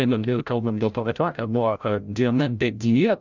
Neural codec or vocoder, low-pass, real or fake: codec, 16 kHz, 0.5 kbps, FreqCodec, larger model; 7.2 kHz; fake